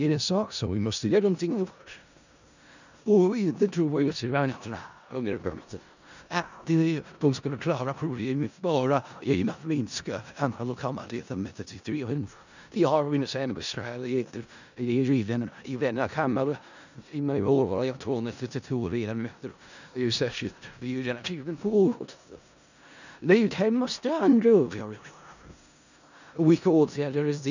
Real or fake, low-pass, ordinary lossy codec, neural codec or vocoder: fake; 7.2 kHz; none; codec, 16 kHz in and 24 kHz out, 0.4 kbps, LongCat-Audio-Codec, four codebook decoder